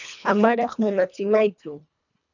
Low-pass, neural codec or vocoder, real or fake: 7.2 kHz; codec, 24 kHz, 1.5 kbps, HILCodec; fake